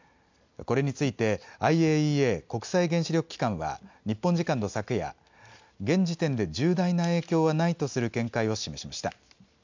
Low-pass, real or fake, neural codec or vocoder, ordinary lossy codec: 7.2 kHz; real; none; MP3, 64 kbps